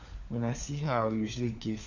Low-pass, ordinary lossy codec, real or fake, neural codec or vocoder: 7.2 kHz; none; fake; codec, 16 kHz, 4 kbps, FunCodec, trained on LibriTTS, 50 frames a second